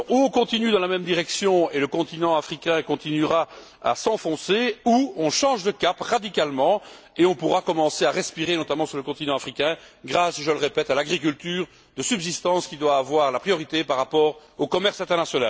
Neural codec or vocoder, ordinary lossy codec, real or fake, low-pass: none; none; real; none